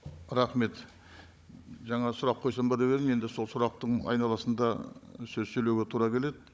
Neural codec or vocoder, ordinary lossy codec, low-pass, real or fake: codec, 16 kHz, 16 kbps, FunCodec, trained on Chinese and English, 50 frames a second; none; none; fake